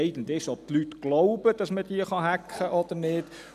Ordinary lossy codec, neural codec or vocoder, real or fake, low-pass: none; none; real; 14.4 kHz